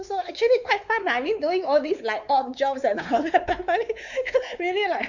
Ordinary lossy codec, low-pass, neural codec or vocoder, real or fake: none; 7.2 kHz; codec, 16 kHz, 4 kbps, X-Codec, WavLM features, trained on Multilingual LibriSpeech; fake